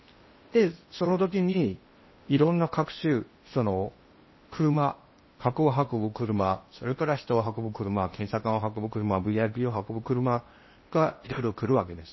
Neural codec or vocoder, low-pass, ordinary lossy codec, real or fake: codec, 16 kHz in and 24 kHz out, 0.8 kbps, FocalCodec, streaming, 65536 codes; 7.2 kHz; MP3, 24 kbps; fake